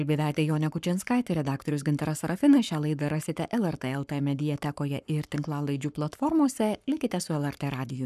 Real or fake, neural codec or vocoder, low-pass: fake; codec, 44.1 kHz, 7.8 kbps, Pupu-Codec; 14.4 kHz